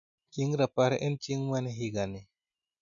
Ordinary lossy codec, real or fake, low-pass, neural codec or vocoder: none; real; 7.2 kHz; none